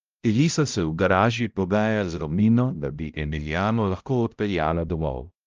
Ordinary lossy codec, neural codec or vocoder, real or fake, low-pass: Opus, 24 kbps; codec, 16 kHz, 0.5 kbps, X-Codec, HuBERT features, trained on balanced general audio; fake; 7.2 kHz